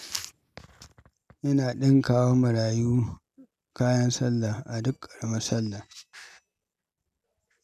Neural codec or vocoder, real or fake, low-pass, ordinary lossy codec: none; real; 14.4 kHz; none